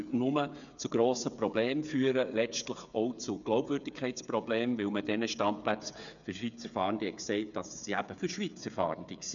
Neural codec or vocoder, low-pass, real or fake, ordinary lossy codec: codec, 16 kHz, 8 kbps, FreqCodec, smaller model; 7.2 kHz; fake; none